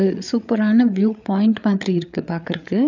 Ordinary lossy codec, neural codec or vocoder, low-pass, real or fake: none; codec, 16 kHz, 8 kbps, FreqCodec, larger model; 7.2 kHz; fake